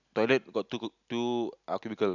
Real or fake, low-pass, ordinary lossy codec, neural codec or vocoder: real; 7.2 kHz; none; none